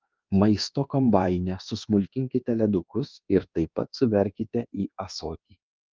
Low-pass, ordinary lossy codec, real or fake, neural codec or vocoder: 7.2 kHz; Opus, 32 kbps; fake; codec, 24 kHz, 1.2 kbps, DualCodec